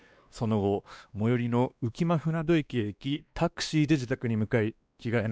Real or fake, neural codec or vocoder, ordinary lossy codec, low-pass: fake; codec, 16 kHz, 2 kbps, X-Codec, WavLM features, trained on Multilingual LibriSpeech; none; none